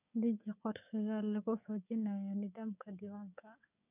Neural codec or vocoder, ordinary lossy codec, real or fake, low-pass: codec, 16 kHz, 6 kbps, DAC; none; fake; 3.6 kHz